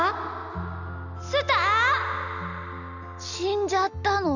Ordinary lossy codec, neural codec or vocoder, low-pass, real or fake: none; none; 7.2 kHz; real